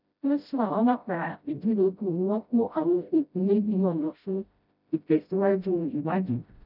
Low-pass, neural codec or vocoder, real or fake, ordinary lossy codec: 5.4 kHz; codec, 16 kHz, 0.5 kbps, FreqCodec, smaller model; fake; none